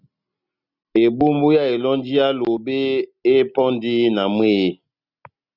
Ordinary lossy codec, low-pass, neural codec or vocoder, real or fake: Opus, 64 kbps; 5.4 kHz; none; real